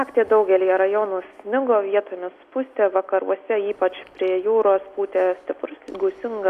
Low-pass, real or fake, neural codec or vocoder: 14.4 kHz; real; none